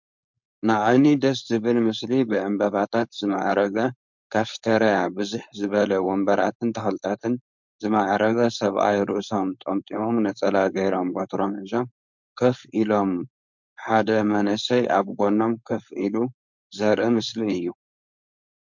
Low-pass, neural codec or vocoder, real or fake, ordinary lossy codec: 7.2 kHz; codec, 16 kHz, 4.8 kbps, FACodec; fake; MP3, 64 kbps